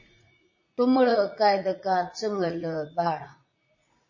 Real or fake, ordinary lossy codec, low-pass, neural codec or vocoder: fake; MP3, 32 kbps; 7.2 kHz; vocoder, 44.1 kHz, 80 mel bands, Vocos